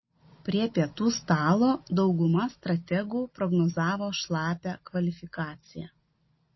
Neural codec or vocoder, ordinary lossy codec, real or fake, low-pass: none; MP3, 24 kbps; real; 7.2 kHz